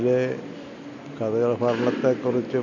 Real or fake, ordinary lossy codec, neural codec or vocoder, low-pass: real; none; none; 7.2 kHz